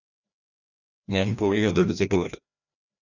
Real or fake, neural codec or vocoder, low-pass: fake; codec, 16 kHz, 1 kbps, FreqCodec, larger model; 7.2 kHz